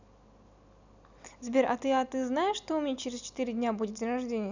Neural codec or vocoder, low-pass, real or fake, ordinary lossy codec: none; 7.2 kHz; real; none